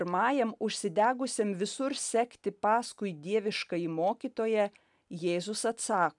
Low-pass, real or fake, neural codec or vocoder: 10.8 kHz; real; none